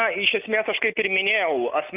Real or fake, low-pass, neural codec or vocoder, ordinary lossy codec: real; 3.6 kHz; none; Opus, 24 kbps